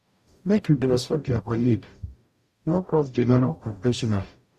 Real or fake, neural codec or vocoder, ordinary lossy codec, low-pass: fake; codec, 44.1 kHz, 0.9 kbps, DAC; Opus, 64 kbps; 14.4 kHz